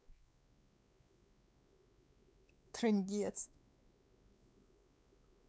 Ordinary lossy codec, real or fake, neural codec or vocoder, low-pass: none; fake; codec, 16 kHz, 4 kbps, X-Codec, WavLM features, trained on Multilingual LibriSpeech; none